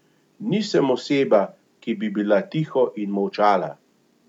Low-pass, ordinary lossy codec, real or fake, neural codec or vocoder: 19.8 kHz; none; real; none